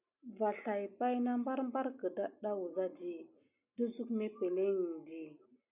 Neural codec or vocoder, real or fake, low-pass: none; real; 3.6 kHz